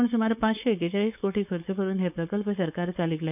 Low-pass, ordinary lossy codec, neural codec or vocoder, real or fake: 3.6 kHz; none; codec, 16 kHz, 4.8 kbps, FACodec; fake